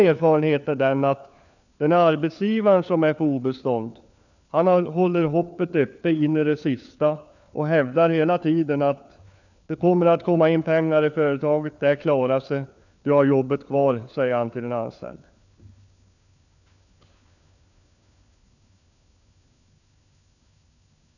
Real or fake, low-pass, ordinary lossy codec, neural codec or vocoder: fake; 7.2 kHz; none; codec, 16 kHz, 4 kbps, FunCodec, trained on LibriTTS, 50 frames a second